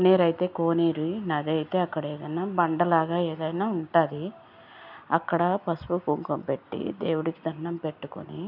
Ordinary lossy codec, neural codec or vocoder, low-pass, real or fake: none; none; 5.4 kHz; real